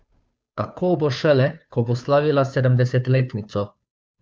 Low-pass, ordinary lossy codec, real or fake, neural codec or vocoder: none; none; fake; codec, 16 kHz, 2 kbps, FunCodec, trained on Chinese and English, 25 frames a second